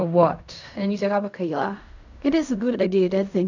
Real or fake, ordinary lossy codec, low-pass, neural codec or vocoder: fake; none; 7.2 kHz; codec, 16 kHz in and 24 kHz out, 0.4 kbps, LongCat-Audio-Codec, fine tuned four codebook decoder